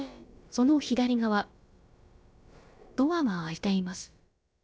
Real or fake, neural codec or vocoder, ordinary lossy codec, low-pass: fake; codec, 16 kHz, about 1 kbps, DyCAST, with the encoder's durations; none; none